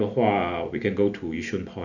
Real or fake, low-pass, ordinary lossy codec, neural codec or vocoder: real; 7.2 kHz; none; none